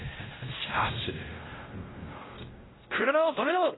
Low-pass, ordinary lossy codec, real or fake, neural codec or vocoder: 7.2 kHz; AAC, 16 kbps; fake; codec, 16 kHz, 0.5 kbps, X-Codec, WavLM features, trained on Multilingual LibriSpeech